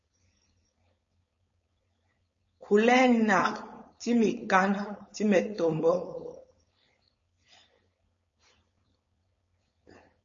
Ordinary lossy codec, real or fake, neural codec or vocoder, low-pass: MP3, 32 kbps; fake; codec, 16 kHz, 4.8 kbps, FACodec; 7.2 kHz